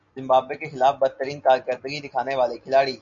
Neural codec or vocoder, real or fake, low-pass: none; real; 7.2 kHz